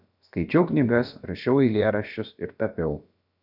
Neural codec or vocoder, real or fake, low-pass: codec, 16 kHz, about 1 kbps, DyCAST, with the encoder's durations; fake; 5.4 kHz